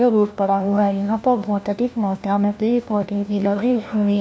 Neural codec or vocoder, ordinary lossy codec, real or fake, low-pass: codec, 16 kHz, 1 kbps, FunCodec, trained on LibriTTS, 50 frames a second; none; fake; none